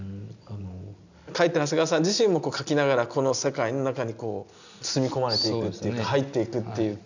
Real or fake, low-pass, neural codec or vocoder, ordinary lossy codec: real; 7.2 kHz; none; none